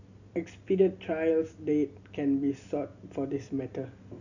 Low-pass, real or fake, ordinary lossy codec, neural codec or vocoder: 7.2 kHz; real; none; none